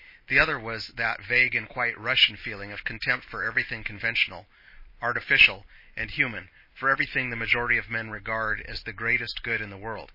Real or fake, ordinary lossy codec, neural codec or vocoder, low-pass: real; MP3, 24 kbps; none; 5.4 kHz